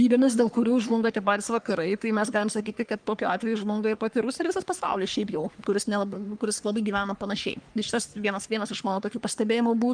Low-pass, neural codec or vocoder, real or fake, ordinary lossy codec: 9.9 kHz; codec, 44.1 kHz, 3.4 kbps, Pupu-Codec; fake; Opus, 32 kbps